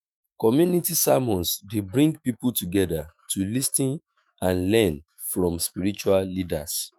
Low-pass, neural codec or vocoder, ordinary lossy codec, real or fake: none; autoencoder, 48 kHz, 128 numbers a frame, DAC-VAE, trained on Japanese speech; none; fake